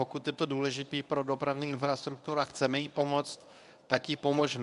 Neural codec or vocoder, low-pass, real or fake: codec, 24 kHz, 0.9 kbps, WavTokenizer, medium speech release version 1; 10.8 kHz; fake